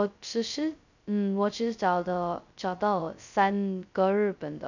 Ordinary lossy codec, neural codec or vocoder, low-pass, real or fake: none; codec, 16 kHz, 0.2 kbps, FocalCodec; 7.2 kHz; fake